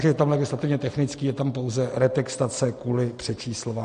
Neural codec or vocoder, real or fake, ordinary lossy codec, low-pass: none; real; MP3, 48 kbps; 9.9 kHz